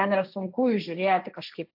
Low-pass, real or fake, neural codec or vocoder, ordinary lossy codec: 5.4 kHz; fake; codec, 16 kHz, 1.1 kbps, Voila-Tokenizer; AAC, 48 kbps